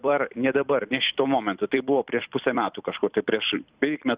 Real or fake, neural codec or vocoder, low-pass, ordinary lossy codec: fake; vocoder, 44.1 kHz, 128 mel bands every 256 samples, BigVGAN v2; 3.6 kHz; Opus, 64 kbps